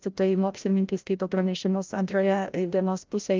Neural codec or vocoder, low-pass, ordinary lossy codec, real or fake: codec, 16 kHz, 0.5 kbps, FreqCodec, larger model; 7.2 kHz; Opus, 24 kbps; fake